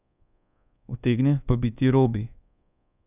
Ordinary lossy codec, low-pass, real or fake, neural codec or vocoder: none; 3.6 kHz; fake; codec, 24 kHz, 1.2 kbps, DualCodec